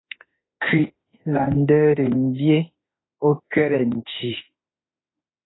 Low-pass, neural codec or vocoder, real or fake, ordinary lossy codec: 7.2 kHz; autoencoder, 48 kHz, 32 numbers a frame, DAC-VAE, trained on Japanese speech; fake; AAC, 16 kbps